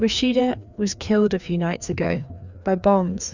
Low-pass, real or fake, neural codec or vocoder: 7.2 kHz; fake; codec, 16 kHz, 2 kbps, FreqCodec, larger model